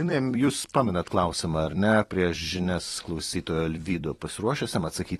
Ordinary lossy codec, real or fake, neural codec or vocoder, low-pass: AAC, 32 kbps; fake; vocoder, 44.1 kHz, 128 mel bands every 256 samples, BigVGAN v2; 19.8 kHz